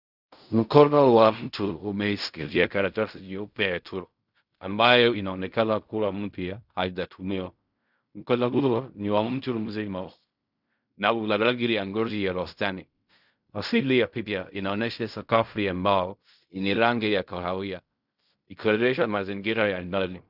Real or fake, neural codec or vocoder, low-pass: fake; codec, 16 kHz in and 24 kHz out, 0.4 kbps, LongCat-Audio-Codec, fine tuned four codebook decoder; 5.4 kHz